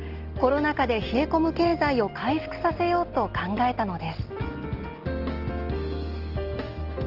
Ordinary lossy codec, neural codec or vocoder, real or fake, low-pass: Opus, 16 kbps; none; real; 5.4 kHz